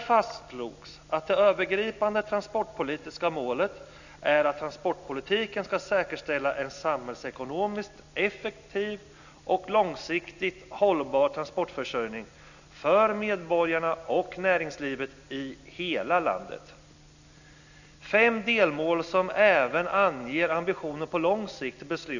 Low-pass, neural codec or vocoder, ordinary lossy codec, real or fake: 7.2 kHz; none; none; real